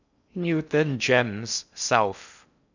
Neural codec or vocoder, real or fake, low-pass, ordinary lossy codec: codec, 16 kHz in and 24 kHz out, 0.6 kbps, FocalCodec, streaming, 2048 codes; fake; 7.2 kHz; none